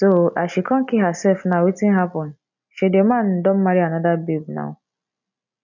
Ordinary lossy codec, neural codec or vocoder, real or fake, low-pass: none; none; real; 7.2 kHz